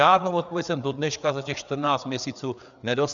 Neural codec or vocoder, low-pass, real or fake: codec, 16 kHz, 4 kbps, FreqCodec, larger model; 7.2 kHz; fake